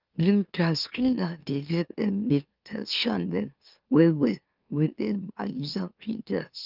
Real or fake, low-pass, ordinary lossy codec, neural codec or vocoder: fake; 5.4 kHz; Opus, 24 kbps; autoencoder, 44.1 kHz, a latent of 192 numbers a frame, MeloTTS